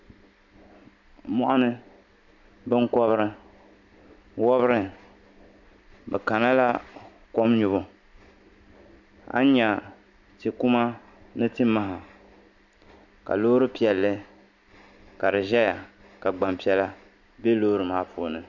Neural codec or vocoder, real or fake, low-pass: none; real; 7.2 kHz